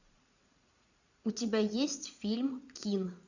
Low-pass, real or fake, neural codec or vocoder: 7.2 kHz; fake; vocoder, 44.1 kHz, 80 mel bands, Vocos